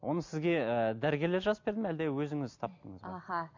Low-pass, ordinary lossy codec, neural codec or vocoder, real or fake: 7.2 kHz; MP3, 48 kbps; none; real